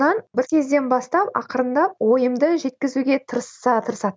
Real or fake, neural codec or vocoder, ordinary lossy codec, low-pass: real; none; none; none